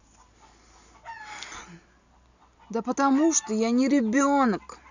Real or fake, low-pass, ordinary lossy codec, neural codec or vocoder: real; 7.2 kHz; none; none